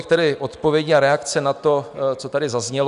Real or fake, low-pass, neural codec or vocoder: real; 10.8 kHz; none